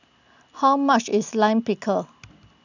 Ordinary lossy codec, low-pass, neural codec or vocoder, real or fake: none; 7.2 kHz; none; real